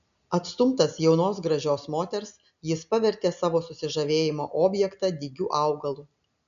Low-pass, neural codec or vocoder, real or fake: 7.2 kHz; none; real